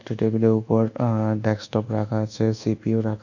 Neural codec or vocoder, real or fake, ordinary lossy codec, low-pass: codec, 24 kHz, 1.2 kbps, DualCodec; fake; none; 7.2 kHz